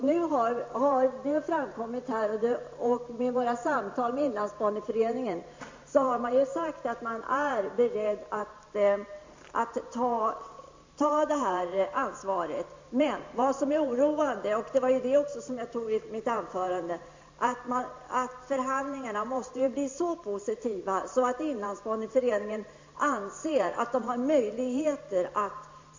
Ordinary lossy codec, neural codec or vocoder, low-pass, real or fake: MP3, 48 kbps; vocoder, 44.1 kHz, 128 mel bands every 512 samples, BigVGAN v2; 7.2 kHz; fake